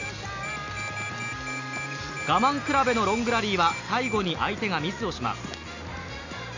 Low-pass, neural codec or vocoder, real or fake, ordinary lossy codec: 7.2 kHz; none; real; AAC, 48 kbps